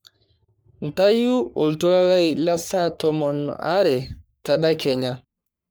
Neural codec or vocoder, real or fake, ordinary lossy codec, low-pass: codec, 44.1 kHz, 3.4 kbps, Pupu-Codec; fake; none; none